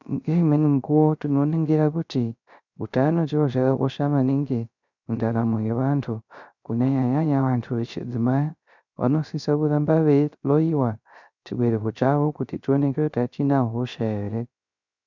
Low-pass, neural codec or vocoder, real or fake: 7.2 kHz; codec, 16 kHz, 0.3 kbps, FocalCodec; fake